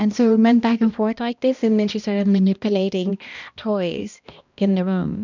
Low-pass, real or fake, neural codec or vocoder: 7.2 kHz; fake; codec, 16 kHz, 1 kbps, X-Codec, HuBERT features, trained on balanced general audio